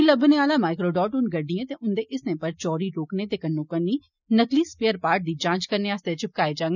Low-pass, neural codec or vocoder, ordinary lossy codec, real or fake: 7.2 kHz; none; none; real